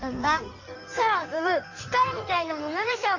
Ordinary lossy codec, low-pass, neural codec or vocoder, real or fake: none; 7.2 kHz; codec, 16 kHz in and 24 kHz out, 1.1 kbps, FireRedTTS-2 codec; fake